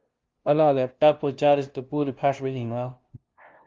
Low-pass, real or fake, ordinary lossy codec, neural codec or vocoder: 7.2 kHz; fake; Opus, 32 kbps; codec, 16 kHz, 0.5 kbps, FunCodec, trained on LibriTTS, 25 frames a second